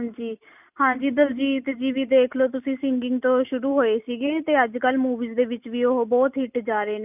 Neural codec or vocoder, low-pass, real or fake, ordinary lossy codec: none; 3.6 kHz; real; none